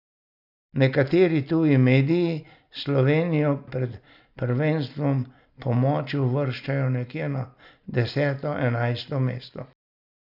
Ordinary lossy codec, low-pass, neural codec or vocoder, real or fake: none; 5.4 kHz; none; real